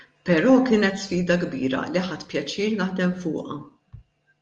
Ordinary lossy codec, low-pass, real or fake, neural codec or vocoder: Opus, 32 kbps; 9.9 kHz; real; none